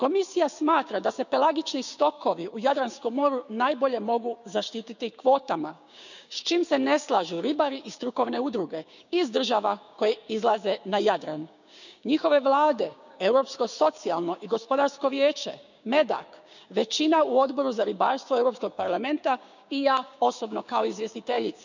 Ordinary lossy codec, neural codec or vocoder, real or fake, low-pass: none; codec, 16 kHz, 6 kbps, DAC; fake; 7.2 kHz